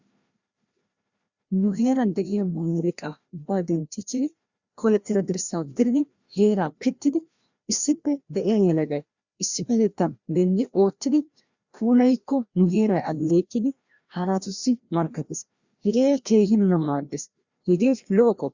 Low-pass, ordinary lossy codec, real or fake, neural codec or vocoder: 7.2 kHz; Opus, 64 kbps; fake; codec, 16 kHz, 1 kbps, FreqCodec, larger model